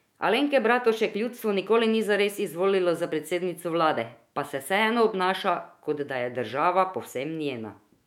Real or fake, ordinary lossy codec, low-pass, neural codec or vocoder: fake; MP3, 96 kbps; 19.8 kHz; autoencoder, 48 kHz, 128 numbers a frame, DAC-VAE, trained on Japanese speech